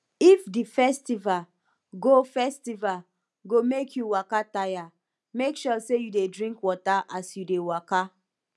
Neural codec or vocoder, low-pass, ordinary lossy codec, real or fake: none; none; none; real